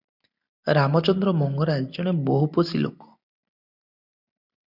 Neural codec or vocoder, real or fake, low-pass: none; real; 5.4 kHz